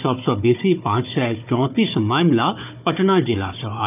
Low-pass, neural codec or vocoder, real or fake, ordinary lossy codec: 3.6 kHz; codec, 16 kHz, 4 kbps, FunCodec, trained on Chinese and English, 50 frames a second; fake; none